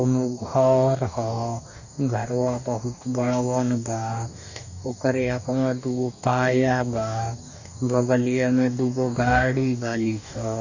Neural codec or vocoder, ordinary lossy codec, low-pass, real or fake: codec, 44.1 kHz, 2.6 kbps, DAC; none; 7.2 kHz; fake